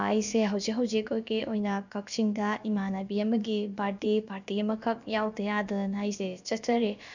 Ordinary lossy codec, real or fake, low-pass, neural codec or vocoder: none; fake; 7.2 kHz; codec, 16 kHz, about 1 kbps, DyCAST, with the encoder's durations